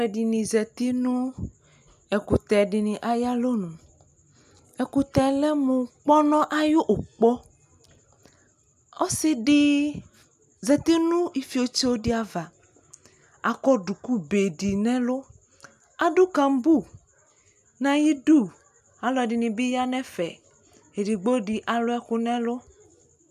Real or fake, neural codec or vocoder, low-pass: real; none; 14.4 kHz